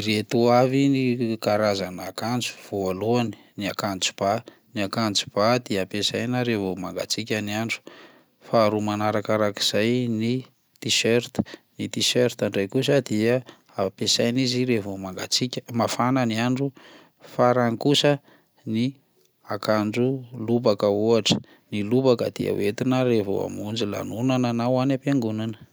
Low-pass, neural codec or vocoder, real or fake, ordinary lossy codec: none; none; real; none